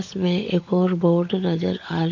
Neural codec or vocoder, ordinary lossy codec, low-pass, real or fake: codec, 16 kHz, 16 kbps, FunCodec, trained on LibriTTS, 50 frames a second; MP3, 48 kbps; 7.2 kHz; fake